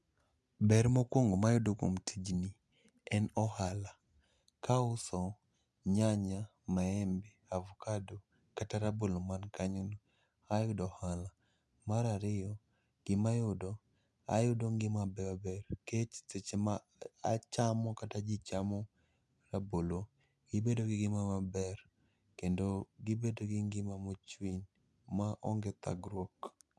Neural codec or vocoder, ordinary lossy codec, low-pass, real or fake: none; none; none; real